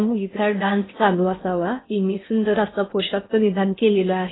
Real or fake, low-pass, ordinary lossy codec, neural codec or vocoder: fake; 7.2 kHz; AAC, 16 kbps; codec, 16 kHz in and 24 kHz out, 0.6 kbps, FocalCodec, streaming, 4096 codes